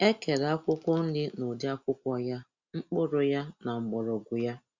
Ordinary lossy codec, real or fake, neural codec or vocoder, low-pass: Opus, 64 kbps; real; none; 7.2 kHz